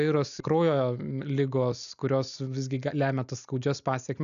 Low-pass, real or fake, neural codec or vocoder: 7.2 kHz; real; none